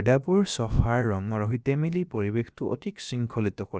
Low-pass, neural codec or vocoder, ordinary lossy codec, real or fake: none; codec, 16 kHz, about 1 kbps, DyCAST, with the encoder's durations; none; fake